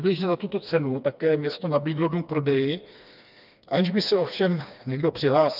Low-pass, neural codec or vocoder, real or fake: 5.4 kHz; codec, 16 kHz, 2 kbps, FreqCodec, smaller model; fake